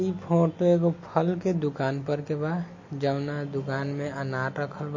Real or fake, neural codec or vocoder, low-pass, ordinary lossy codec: real; none; 7.2 kHz; MP3, 32 kbps